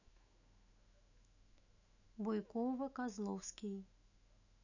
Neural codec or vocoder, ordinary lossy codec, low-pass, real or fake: autoencoder, 48 kHz, 128 numbers a frame, DAC-VAE, trained on Japanese speech; none; 7.2 kHz; fake